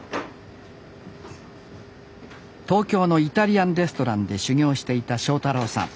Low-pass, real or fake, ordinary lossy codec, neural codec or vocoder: none; real; none; none